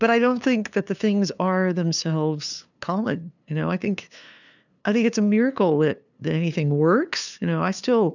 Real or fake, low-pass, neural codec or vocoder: fake; 7.2 kHz; codec, 16 kHz, 2 kbps, FunCodec, trained on LibriTTS, 25 frames a second